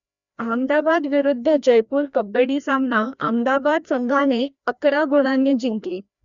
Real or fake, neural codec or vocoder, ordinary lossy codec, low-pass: fake; codec, 16 kHz, 1 kbps, FreqCodec, larger model; none; 7.2 kHz